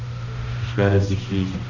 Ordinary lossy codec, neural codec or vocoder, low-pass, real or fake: none; codec, 16 kHz, 1 kbps, X-Codec, HuBERT features, trained on balanced general audio; 7.2 kHz; fake